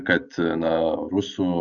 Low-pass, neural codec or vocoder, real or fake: 7.2 kHz; none; real